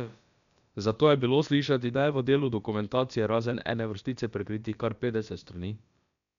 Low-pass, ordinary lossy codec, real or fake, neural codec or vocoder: 7.2 kHz; none; fake; codec, 16 kHz, about 1 kbps, DyCAST, with the encoder's durations